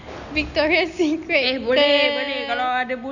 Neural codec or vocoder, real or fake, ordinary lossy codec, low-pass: none; real; none; 7.2 kHz